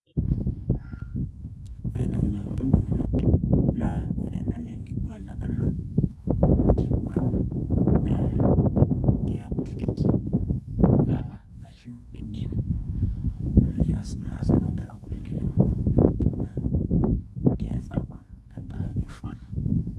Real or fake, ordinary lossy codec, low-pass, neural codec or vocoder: fake; none; none; codec, 24 kHz, 0.9 kbps, WavTokenizer, medium music audio release